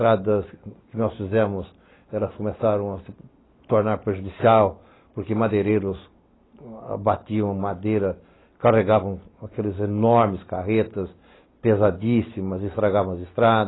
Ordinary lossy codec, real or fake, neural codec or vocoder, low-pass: AAC, 16 kbps; real; none; 7.2 kHz